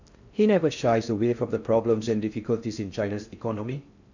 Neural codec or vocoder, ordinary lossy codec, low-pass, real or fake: codec, 16 kHz in and 24 kHz out, 0.6 kbps, FocalCodec, streaming, 4096 codes; none; 7.2 kHz; fake